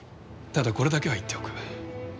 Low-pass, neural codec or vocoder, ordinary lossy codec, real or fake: none; none; none; real